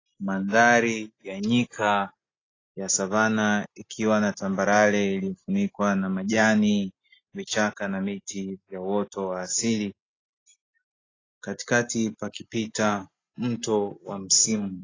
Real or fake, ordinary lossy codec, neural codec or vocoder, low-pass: real; AAC, 32 kbps; none; 7.2 kHz